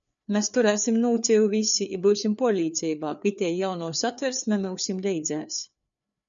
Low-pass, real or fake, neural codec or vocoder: 7.2 kHz; fake; codec, 16 kHz, 4 kbps, FreqCodec, larger model